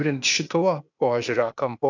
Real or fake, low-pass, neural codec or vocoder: fake; 7.2 kHz; codec, 16 kHz, 0.8 kbps, ZipCodec